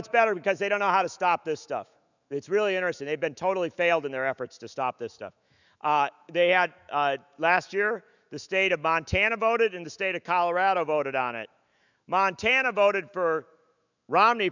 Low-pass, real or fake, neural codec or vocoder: 7.2 kHz; fake; autoencoder, 48 kHz, 128 numbers a frame, DAC-VAE, trained on Japanese speech